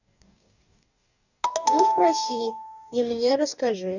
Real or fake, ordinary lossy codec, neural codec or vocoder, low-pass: fake; none; codec, 44.1 kHz, 2.6 kbps, DAC; 7.2 kHz